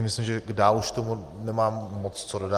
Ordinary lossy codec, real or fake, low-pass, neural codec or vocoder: Opus, 24 kbps; real; 14.4 kHz; none